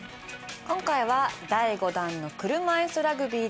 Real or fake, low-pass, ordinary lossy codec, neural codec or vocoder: real; none; none; none